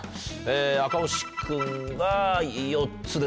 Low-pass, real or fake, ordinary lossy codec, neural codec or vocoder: none; real; none; none